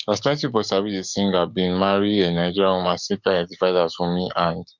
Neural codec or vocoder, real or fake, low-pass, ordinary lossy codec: codec, 44.1 kHz, 7.8 kbps, DAC; fake; 7.2 kHz; MP3, 64 kbps